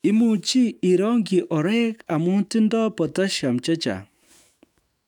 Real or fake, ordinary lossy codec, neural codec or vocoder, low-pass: fake; none; autoencoder, 48 kHz, 128 numbers a frame, DAC-VAE, trained on Japanese speech; 19.8 kHz